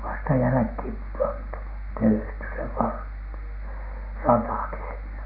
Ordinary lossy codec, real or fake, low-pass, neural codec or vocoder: none; real; 5.4 kHz; none